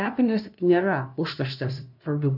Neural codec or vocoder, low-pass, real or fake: codec, 16 kHz, 0.5 kbps, FunCodec, trained on LibriTTS, 25 frames a second; 5.4 kHz; fake